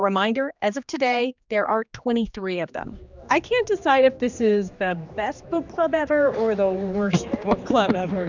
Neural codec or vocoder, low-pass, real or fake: codec, 16 kHz, 2 kbps, X-Codec, HuBERT features, trained on general audio; 7.2 kHz; fake